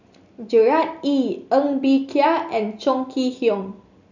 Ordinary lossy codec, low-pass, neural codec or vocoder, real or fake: none; 7.2 kHz; none; real